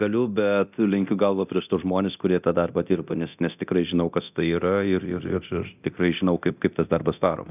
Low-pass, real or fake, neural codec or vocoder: 3.6 kHz; fake; codec, 24 kHz, 0.9 kbps, DualCodec